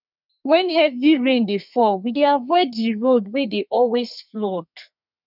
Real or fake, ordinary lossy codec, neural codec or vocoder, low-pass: fake; none; codec, 32 kHz, 1.9 kbps, SNAC; 5.4 kHz